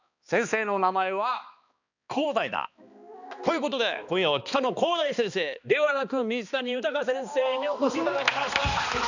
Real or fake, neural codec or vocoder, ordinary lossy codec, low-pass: fake; codec, 16 kHz, 2 kbps, X-Codec, HuBERT features, trained on balanced general audio; none; 7.2 kHz